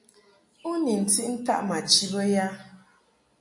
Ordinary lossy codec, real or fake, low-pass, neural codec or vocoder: AAC, 64 kbps; real; 10.8 kHz; none